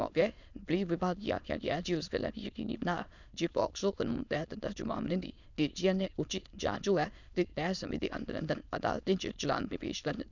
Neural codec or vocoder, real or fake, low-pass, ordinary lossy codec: autoencoder, 22.05 kHz, a latent of 192 numbers a frame, VITS, trained on many speakers; fake; 7.2 kHz; none